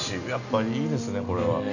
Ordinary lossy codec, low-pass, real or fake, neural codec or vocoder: none; 7.2 kHz; real; none